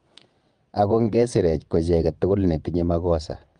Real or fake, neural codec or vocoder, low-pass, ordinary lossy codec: fake; vocoder, 22.05 kHz, 80 mel bands, WaveNeXt; 9.9 kHz; Opus, 32 kbps